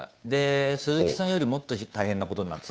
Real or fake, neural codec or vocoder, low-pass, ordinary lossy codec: fake; codec, 16 kHz, 2 kbps, FunCodec, trained on Chinese and English, 25 frames a second; none; none